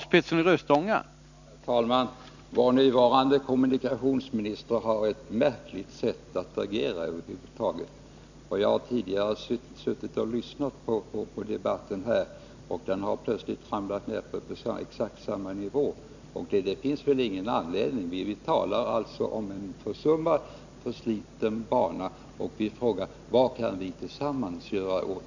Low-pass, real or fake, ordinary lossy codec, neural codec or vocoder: 7.2 kHz; real; none; none